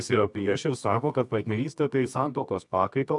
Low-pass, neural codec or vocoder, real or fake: 10.8 kHz; codec, 24 kHz, 0.9 kbps, WavTokenizer, medium music audio release; fake